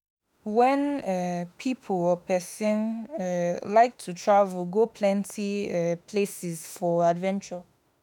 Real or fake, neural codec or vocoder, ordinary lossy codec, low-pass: fake; autoencoder, 48 kHz, 32 numbers a frame, DAC-VAE, trained on Japanese speech; none; none